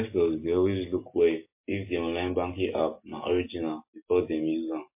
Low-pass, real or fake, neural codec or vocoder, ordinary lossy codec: 3.6 kHz; real; none; MP3, 24 kbps